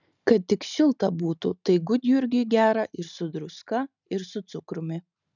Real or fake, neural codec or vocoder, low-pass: real; none; 7.2 kHz